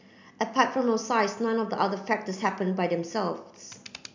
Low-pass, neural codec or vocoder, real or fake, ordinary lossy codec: 7.2 kHz; none; real; MP3, 64 kbps